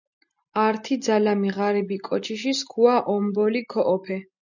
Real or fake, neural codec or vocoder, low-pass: real; none; 7.2 kHz